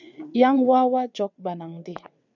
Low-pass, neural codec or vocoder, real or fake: 7.2 kHz; vocoder, 22.05 kHz, 80 mel bands, WaveNeXt; fake